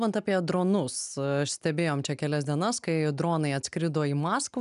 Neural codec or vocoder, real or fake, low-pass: none; real; 10.8 kHz